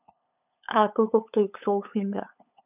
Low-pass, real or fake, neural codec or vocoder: 3.6 kHz; fake; codec, 16 kHz, 8 kbps, FunCodec, trained on LibriTTS, 25 frames a second